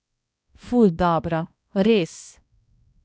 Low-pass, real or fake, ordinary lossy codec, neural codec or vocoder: none; fake; none; codec, 16 kHz, 1 kbps, X-Codec, HuBERT features, trained on balanced general audio